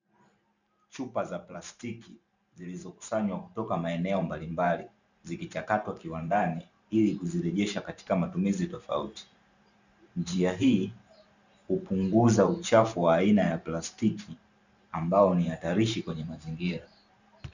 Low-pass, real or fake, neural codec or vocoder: 7.2 kHz; fake; vocoder, 44.1 kHz, 128 mel bands every 512 samples, BigVGAN v2